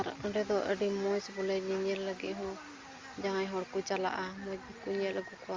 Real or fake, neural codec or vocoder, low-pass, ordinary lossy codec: real; none; 7.2 kHz; Opus, 32 kbps